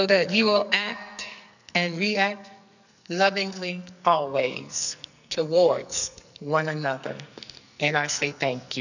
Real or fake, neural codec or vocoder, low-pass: fake; codec, 44.1 kHz, 2.6 kbps, SNAC; 7.2 kHz